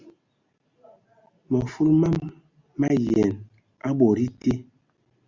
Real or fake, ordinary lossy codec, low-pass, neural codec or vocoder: real; Opus, 64 kbps; 7.2 kHz; none